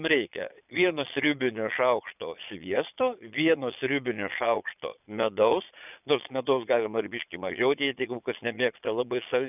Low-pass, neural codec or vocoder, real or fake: 3.6 kHz; vocoder, 22.05 kHz, 80 mel bands, Vocos; fake